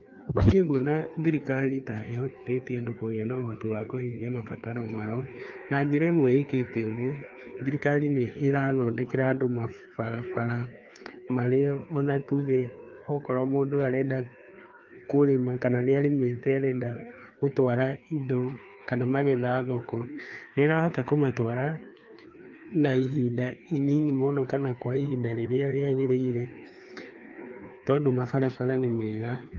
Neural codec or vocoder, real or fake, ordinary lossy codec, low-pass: codec, 16 kHz, 2 kbps, FreqCodec, larger model; fake; Opus, 32 kbps; 7.2 kHz